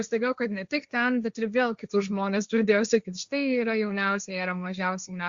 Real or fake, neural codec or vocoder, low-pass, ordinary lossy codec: fake; codec, 16 kHz, 1.1 kbps, Voila-Tokenizer; 7.2 kHz; Opus, 64 kbps